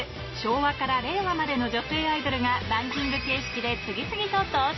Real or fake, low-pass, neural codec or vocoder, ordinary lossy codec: real; 7.2 kHz; none; MP3, 24 kbps